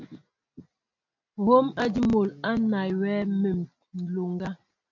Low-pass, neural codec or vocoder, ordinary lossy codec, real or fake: 7.2 kHz; none; MP3, 48 kbps; real